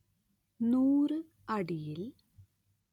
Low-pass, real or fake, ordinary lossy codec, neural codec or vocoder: 19.8 kHz; real; none; none